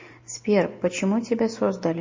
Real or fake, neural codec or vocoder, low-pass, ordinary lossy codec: real; none; 7.2 kHz; MP3, 32 kbps